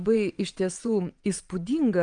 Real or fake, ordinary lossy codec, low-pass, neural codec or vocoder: fake; Opus, 32 kbps; 9.9 kHz; vocoder, 22.05 kHz, 80 mel bands, WaveNeXt